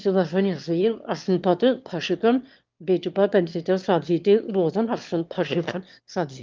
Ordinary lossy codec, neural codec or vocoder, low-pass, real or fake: Opus, 32 kbps; autoencoder, 22.05 kHz, a latent of 192 numbers a frame, VITS, trained on one speaker; 7.2 kHz; fake